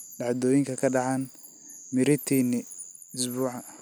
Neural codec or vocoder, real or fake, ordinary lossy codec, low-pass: none; real; none; none